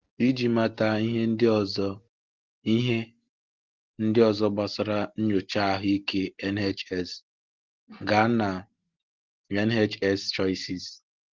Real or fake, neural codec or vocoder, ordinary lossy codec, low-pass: real; none; Opus, 16 kbps; 7.2 kHz